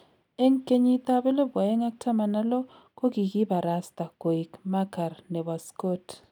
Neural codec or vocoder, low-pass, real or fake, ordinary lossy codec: none; 19.8 kHz; real; none